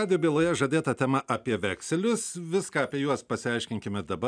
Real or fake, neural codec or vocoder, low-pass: real; none; 9.9 kHz